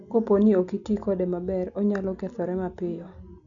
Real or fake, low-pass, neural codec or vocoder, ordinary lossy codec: real; 7.2 kHz; none; none